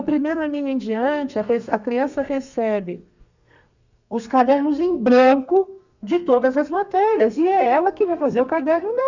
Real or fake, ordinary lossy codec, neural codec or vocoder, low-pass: fake; none; codec, 32 kHz, 1.9 kbps, SNAC; 7.2 kHz